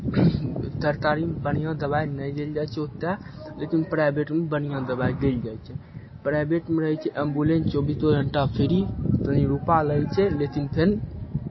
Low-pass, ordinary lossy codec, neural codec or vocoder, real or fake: 7.2 kHz; MP3, 24 kbps; none; real